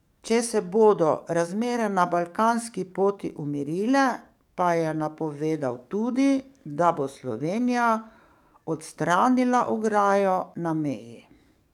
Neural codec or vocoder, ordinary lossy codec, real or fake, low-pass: codec, 44.1 kHz, 7.8 kbps, DAC; none; fake; 19.8 kHz